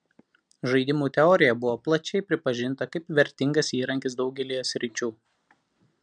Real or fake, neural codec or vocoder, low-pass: real; none; 9.9 kHz